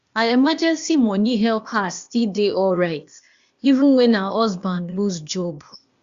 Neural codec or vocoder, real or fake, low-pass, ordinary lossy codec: codec, 16 kHz, 0.8 kbps, ZipCodec; fake; 7.2 kHz; Opus, 64 kbps